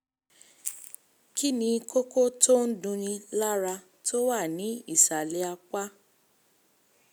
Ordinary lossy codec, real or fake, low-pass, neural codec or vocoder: none; real; none; none